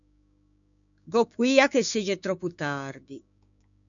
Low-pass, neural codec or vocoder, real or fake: 7.2 kHz; codec, 16 kHz, 6 kbps, DAC; fake